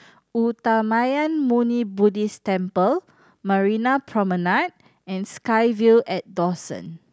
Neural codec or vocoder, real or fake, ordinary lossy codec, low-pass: none; real; none; none